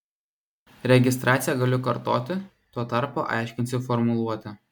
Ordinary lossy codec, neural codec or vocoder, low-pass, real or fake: MP3, 96 kbps; none; 19.8 kHz; real